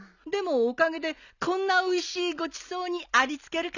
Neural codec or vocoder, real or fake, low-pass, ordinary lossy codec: none; real; 7.2 kHz; none